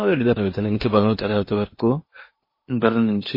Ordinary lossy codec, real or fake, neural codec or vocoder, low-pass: MP3, 24 kbps; fake; codec, 16 kHz in and 24 kHz out, 0.8 kbps, FocalCodec, streaming, 65536 codes; 5.4 kHz